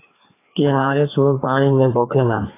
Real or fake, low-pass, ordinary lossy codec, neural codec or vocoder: fake; 3.6 kHz; AAC, 16 kbps; codec, 16 kHz, 2 kbps, FreqCodec, larger model